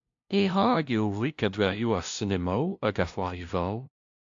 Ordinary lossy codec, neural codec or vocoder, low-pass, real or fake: AAC, 64 kbps; codec, 16 kHz, 0.5 kbps, FunCodec, trained on LibriTTS, 25 frames a second; 7.2 kHz; fake